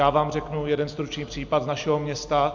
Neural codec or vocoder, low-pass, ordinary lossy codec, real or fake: none; 7.2 kHz; MP3, 64 kbps; real